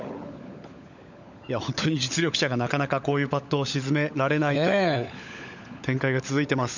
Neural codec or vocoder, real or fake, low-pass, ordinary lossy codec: codec, 16 kHz, 16 kbps, FunCodec, trained on LibriTTS, 50 frames a second; fake; 7.2 kHz; none